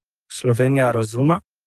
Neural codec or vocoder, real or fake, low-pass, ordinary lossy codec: codec, 44.1 kHz, 2.6 kbps, SNAC; fake; 14.4 kHz; Opus, 32 kbps